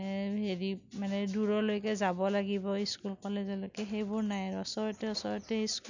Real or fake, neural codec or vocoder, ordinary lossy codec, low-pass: real; none; none; 7.2 kHz